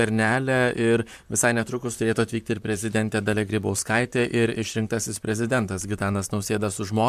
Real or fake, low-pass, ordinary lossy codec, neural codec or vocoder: fake; 14.4 kHz; MP3, 96 kbps; vocoder, 44.1 kHz, 128 mel bands, Pupu-Vocoder